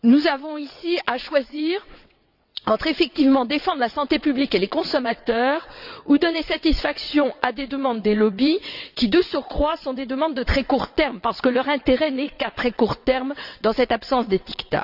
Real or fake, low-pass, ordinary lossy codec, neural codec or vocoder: fake; 5.4 kHz; none; vocoder, 22.05 kHz, 80 mel bands, WaveNeXt